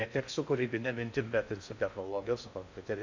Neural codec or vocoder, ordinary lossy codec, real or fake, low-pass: codec, 16 kHz in and 24 kHz out, 0.6 kbps, FocalCodec, streaming, 4096 codes; MP3, 64 kbps; fake; 7.2 kHz